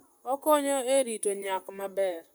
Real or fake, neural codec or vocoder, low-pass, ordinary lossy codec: fake; vocoder, 44.1 kHz, 128 mel bands, Pupu-Vocoder; none; none